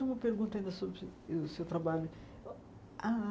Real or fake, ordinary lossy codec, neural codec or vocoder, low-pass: real; none; none; none